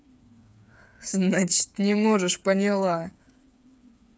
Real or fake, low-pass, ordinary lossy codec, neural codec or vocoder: fake; none; none; codec, 16 kHz, 8 kbps, FreqCodec, smaller model